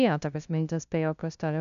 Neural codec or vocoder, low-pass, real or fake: codec, 16 kHz, 0.5 kbps, FunCodec, trained on LibriTTS, 25 frames a second; 7.2 kHz; fake